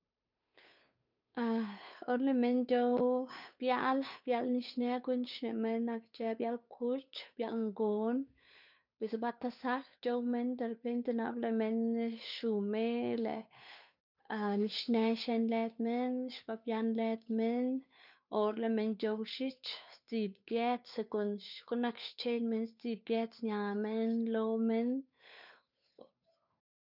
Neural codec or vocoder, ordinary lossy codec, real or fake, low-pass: codec, 16 kHz, 8 kbps, FunCodec, trained on Chinese and English, 25 frames a second; none; fake; 5.4 kHz